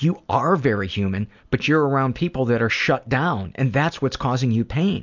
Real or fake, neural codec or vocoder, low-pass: real; none; 7.2 kHz